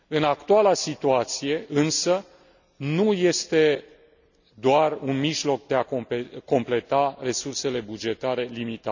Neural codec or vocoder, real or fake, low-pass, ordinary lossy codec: none; real; 7.2 kHz; none